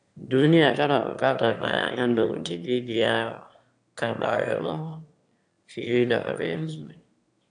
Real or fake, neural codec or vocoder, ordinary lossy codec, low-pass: fake; autoencoder, 22.05 kHz, a latent of 192 numbers a frame, VITS, trained on one speaker; AAC, 64 kbps; 9.9 kHz